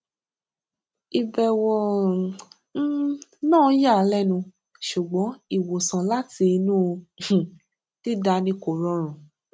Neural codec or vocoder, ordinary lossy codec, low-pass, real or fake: none; none; none; real